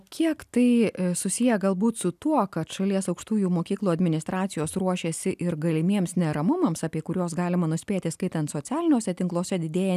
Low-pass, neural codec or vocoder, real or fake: 14.4 kHz; none; real